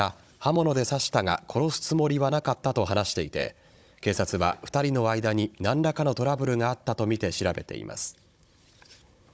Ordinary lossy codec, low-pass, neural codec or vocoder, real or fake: none; none; codec, 16 kHz, 16 kbps, FunCodec, trained on Chinese and English, 50 frames a second; fake